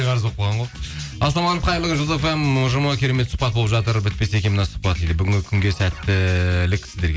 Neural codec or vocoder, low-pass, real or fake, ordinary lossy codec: none; none; real; none